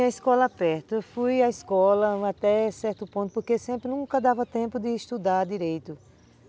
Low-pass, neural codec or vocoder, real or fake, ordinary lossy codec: none; none; real; none